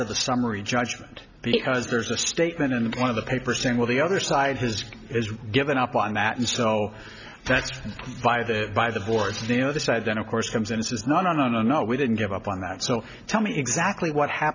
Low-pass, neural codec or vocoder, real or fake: 7.2 kHz; none; real